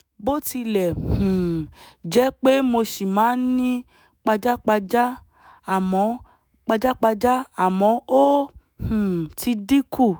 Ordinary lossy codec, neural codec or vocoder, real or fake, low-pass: none; autoencoder, 48 kHz, 128 numbers a frame, DAC-VAE, trained on Japanese speech; fake; none